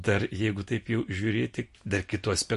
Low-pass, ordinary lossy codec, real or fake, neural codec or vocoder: 14.4 kHz; MP3, 48 kbps; real; none